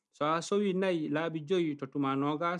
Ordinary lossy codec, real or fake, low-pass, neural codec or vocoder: none; real; 10.8 kHz; none